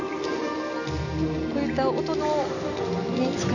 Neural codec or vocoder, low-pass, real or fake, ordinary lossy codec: none; 7.2 kHz; real; none